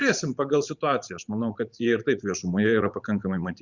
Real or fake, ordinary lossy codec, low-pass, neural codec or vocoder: fake; Opus, 64 kbps; 7.2 kHz; vocoder, 44.1 kHz, 80 mel bands, Vocos